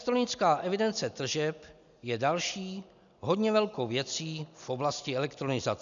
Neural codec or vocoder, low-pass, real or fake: none; 7.2 kHz; real